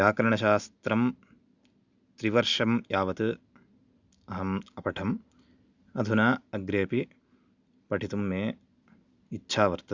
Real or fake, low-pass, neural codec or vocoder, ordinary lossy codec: real; 7.2 kHz; none; Opus, 64 kbps